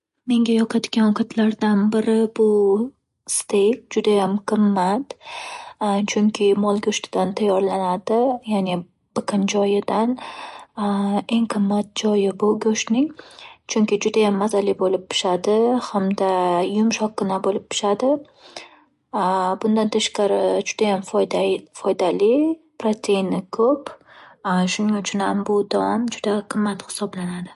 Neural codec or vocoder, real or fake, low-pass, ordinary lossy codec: none; real; 14.4 kHz; MP3, 48 kbps